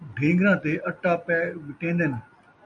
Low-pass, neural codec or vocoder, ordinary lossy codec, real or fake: 9.9 kHz; none; MP3, 64 kbps; real